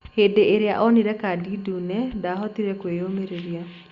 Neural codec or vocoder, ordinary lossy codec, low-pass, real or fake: none; Opus, 64 kbps; 7.2 kHz; real